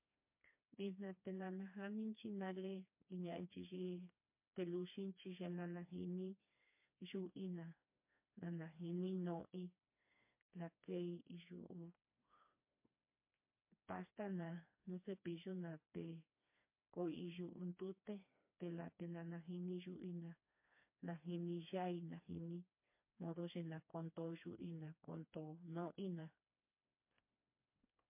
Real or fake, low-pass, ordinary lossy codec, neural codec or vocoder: fake; 3.6 kHz; MP3, 24 kbps; codec, 16 kHz, 2 kbps, FreqCodec, smaller model